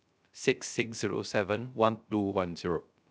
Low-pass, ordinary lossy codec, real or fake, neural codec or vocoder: none; none; fake; codec, 16 kHz, 0.3 kbps, FocalCodec